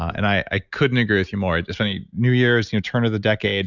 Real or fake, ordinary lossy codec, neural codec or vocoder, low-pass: real; Opus, 64 kbps; none; 7.2 kHz